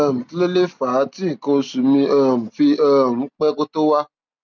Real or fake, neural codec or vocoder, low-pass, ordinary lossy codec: real; none; 7.2 kHz; none